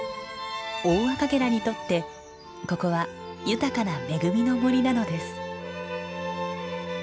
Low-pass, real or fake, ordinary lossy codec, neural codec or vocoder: none; real; none; none